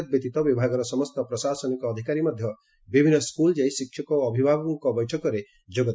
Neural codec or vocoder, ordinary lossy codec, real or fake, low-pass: none; none; real; none